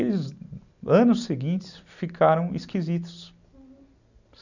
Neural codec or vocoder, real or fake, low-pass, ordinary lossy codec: none; real; 7.2 kHz; none